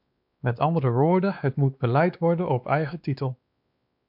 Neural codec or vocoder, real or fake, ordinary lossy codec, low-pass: codec, 16 kHz, 2 kbps, X-Codec, WavLM features, trained on Multilingual LibriSpeech; fake; MP3, 48 kbps; 5.4 kHz